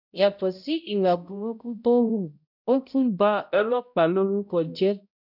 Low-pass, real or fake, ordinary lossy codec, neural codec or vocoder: 5.4 kHz; fake; none; codec, 16 kHz, 0.5 kbps, X-Codec, HuBERT features, trained on balanced general audio